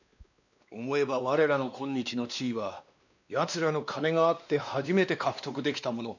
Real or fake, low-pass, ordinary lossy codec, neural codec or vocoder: fake; 7.2 kHz; none; codec, 16 kHz, 2 kbps, X-Codec, WavLM features, trained on Multilingual LibriSpeech